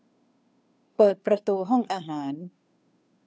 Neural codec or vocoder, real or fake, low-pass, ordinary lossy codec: codec, 16 kHz, 2 kbps, FunCodec, trained on Chinese and English, 25 frames a second; fake; none; none